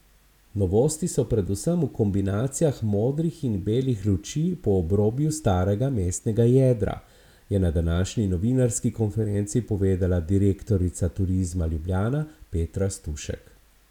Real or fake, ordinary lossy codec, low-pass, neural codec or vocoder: real; none; 19.8 kHz; none